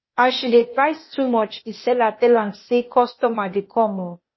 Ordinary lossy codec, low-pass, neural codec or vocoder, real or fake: MP3, 24 kbps; 7.2 kHz; codec, 16 kHz, 0.8 kbps, ZipCodec; fake